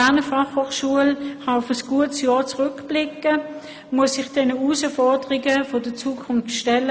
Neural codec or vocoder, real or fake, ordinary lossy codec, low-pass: none; real; none; none